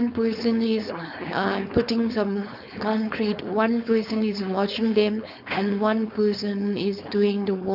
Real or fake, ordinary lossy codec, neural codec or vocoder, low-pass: fake; none; codec, 16 kHz, 4.8 kbps, FACodec; 5.4 kHz